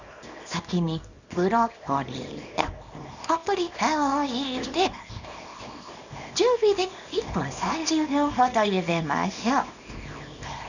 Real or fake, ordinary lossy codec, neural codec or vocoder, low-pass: fake; none; codec, 24 kHz, 0.9 kbps, WavTokenizer, small release; 7.2 kHz